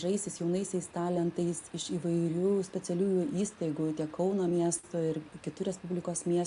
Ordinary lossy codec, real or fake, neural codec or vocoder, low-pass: AAC, 64 kbps; real; none; 10.8 kHz